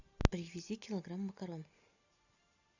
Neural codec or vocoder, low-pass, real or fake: none; 7.2 kHz; real